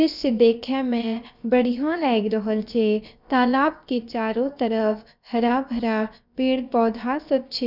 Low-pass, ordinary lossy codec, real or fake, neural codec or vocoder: 5.4 kHz; none; fake; codec, 16 kHz, about 1 kbps, DyCAST, with the encoder's durations